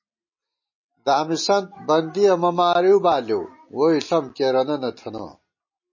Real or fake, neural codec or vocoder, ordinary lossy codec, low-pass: real; none; MP3, 32 kbps; 7.2 kHz